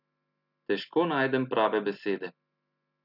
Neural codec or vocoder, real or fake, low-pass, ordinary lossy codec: none; real; 5.4 kHz; none